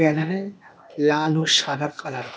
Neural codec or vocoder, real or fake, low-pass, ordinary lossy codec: codec, 16 kHz, 0.8 kbps, ZipCodec; fake; none; none